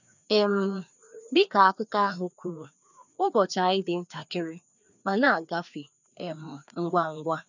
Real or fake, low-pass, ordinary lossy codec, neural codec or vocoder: fake; 7.2 kHz; none; codec, 16 kHz, 2 kbps, FreqCodec, larger model